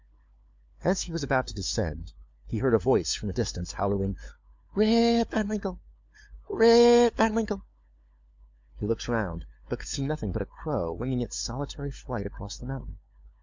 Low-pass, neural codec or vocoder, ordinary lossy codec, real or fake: 7.2 kHz; codec, 16 kHz, 4 kbps, FunCodec, trained on LibriTTS, 50 frames a second; MP3, 64 kbps; fake